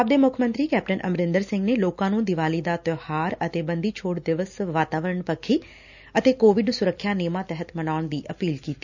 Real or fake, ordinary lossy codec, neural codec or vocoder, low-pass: real; none; none; 7.2 kHz